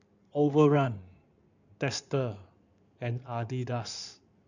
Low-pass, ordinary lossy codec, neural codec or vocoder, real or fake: 7.2 kHz; none; codec, 16 kHz in and 24 kHz out, 2.2 kbps, FireRedTTS-2 codec; fake